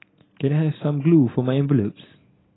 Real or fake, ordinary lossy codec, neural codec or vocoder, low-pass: real; AAC, 16 kbps; none; 7.2 kHz